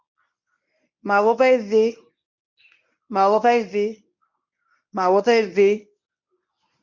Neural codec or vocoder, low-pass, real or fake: codec, 24 kHz, 0.9 kbps, WavTokenizer, medium speech release version 1; 7.2 kHz; fake